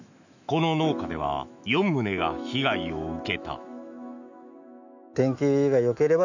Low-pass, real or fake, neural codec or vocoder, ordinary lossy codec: 7.2 kHz; fake; autoencoder, 48 kHz, 128 numbers a frame, DAC-VAE, trained on Japanese speech; none